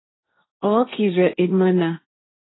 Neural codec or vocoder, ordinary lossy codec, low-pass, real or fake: codec, 16 kHz, 1.1 kbps, Voila-Tokenizer; AAC, 16 kbps; 7.2 kHz; fake